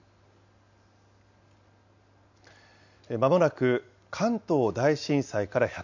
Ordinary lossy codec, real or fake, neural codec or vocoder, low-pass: none; real; none; 7.2 kHz